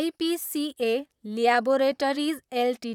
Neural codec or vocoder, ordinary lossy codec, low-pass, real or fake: vocoder, 44.1 kHz, 128 mel bands, Pupu-Vocoder; none; 19.8 kHz; fake